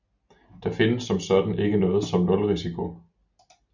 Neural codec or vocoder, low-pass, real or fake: none; 7.2 kHz; real